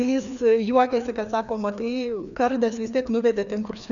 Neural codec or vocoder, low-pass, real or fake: codec, 16 kHz, 2 kbps, FreqCodec, larger model; 7.2 kHz; fake